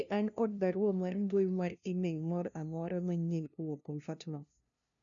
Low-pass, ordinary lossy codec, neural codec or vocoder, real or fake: 7.2 kHz; none; codec, 16 kHz, 0.5 kbps, FunCodec, trained on LibriTTS, 25 frames a second; fake